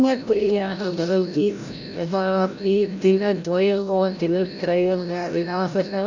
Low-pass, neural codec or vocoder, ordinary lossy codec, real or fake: 7.2 kHz; codec, 16 kHz, 0.5 kbps, FreqCodec, larger model; none; fake